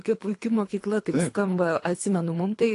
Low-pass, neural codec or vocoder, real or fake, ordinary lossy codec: 10.8 kHz; codec, 24 kHz, 3 kbps, HILCodec; fake; AAC, 48 kbps